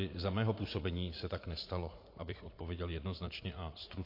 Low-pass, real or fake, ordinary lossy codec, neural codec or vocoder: 5.4 kHz; fake; MP3, 32 kbps; vocoder, 44.1 kHz, 80 mel bands, Vocos